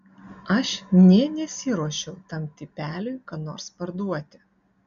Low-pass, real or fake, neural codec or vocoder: 7.2 kHz; real; none